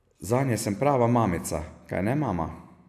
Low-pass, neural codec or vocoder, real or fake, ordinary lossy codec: 14.4 kHz; none; real; MP3, 96 kbps